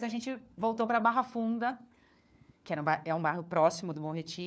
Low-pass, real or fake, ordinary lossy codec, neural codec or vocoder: none; fake; none; codec, 16 kHz, 4 kbps, FunCodec, trained on LibriTTS, 50 frames a second